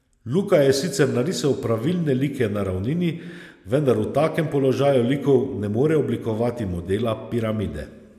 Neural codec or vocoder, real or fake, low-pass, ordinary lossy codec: none; real; 14.4 kHz; MP3, 96 kbps